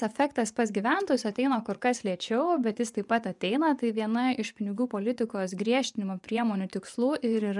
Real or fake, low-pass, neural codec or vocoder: fake; 10.8 kHz; vocoder, 24 kHz, 100 mel bands, Vocos